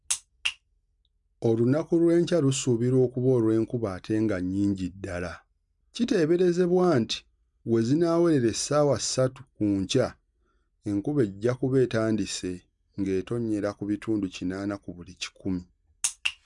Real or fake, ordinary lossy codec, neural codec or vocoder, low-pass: real; none; none; 10.8 kHz